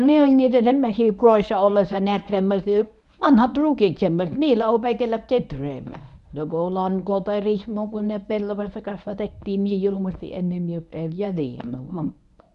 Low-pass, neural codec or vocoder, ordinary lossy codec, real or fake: 10.8 kHz; codec, 24 kHz, 0.9 kbps, WavTokenizer, medium speech release version 1; none; fake